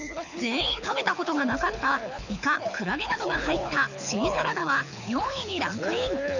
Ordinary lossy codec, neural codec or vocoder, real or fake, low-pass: none; codec, 24 kHz, 6 kbps, HILCodec; fake; 7.2 kHz